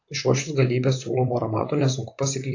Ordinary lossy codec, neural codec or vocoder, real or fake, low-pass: AAC, 48 kbps; vocoder, 44.1 kHz, 128 mel bands, Pupu-Vocoder; fake; 7.2 kHz